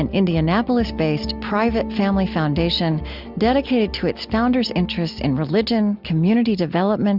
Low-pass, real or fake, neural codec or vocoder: 5.4 kHz; real; none